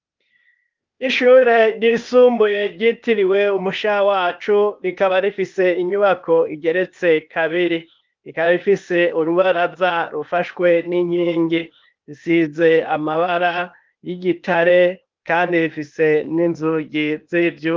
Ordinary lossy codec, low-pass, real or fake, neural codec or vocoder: Opus, 24 kbps; 7.2 kHz; fake; codec, 16 kHz, 0.8 kbps, ZipCodec